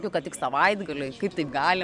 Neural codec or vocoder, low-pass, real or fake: none; 10.8 kHz; real